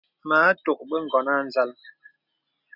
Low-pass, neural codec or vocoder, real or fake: 5.4 kHz; none; real